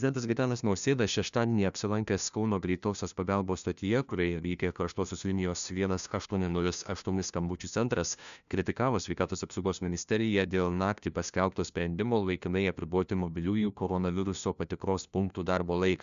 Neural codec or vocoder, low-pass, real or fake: codec, 16 kHz, 1 kbps, FunCodec, trained on LibriTTS, 50 frames a second; 7.2 kHz; fake